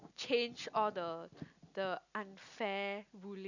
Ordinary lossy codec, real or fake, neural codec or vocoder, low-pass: none; real; none; 7.2 kHz